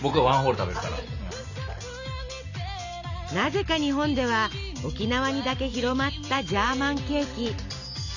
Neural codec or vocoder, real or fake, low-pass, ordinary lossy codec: none; real; 7.2 kHz; none